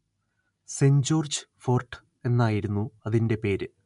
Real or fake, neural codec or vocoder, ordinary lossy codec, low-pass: real; none; MP3, 64 kbps; 10.8 kHz